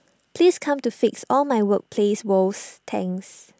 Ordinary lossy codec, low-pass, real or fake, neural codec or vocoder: none; none; real; none